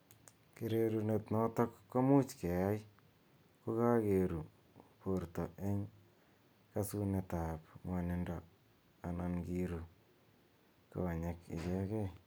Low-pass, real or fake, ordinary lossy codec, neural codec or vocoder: none; real; none; none